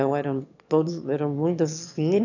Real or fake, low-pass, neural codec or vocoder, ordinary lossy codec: fake; 7.2 kHz; autoencoder, 22.05 kHz, a latent of 192 numbers a frame, VITS, trained on one speaker; none